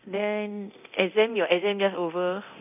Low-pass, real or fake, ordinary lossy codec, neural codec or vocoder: 3.6 kHz; fake; none; codec, 24 kHz, 0.9 kbps, DualCodec